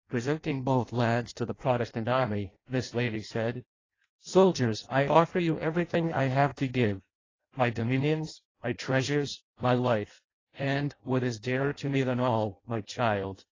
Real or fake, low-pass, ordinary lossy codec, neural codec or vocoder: fake; 7.2 kHz; AAC, 32 kbps; codec, 16 kHz in and 24 kHz out, 0.6 kbps, FireRedTTS-2 codec